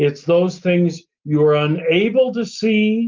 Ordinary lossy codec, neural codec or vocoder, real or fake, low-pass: Opus, 32 kbps; none; real; 7.2 kHz